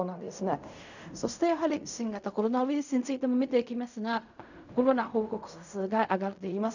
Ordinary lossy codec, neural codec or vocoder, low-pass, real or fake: none; codec, 16 kHz in and 24 kHz out, 0.4 kbps, LongCat-Audio-Codec, fine tuned four codebook decoder; 7.2 kHz; fake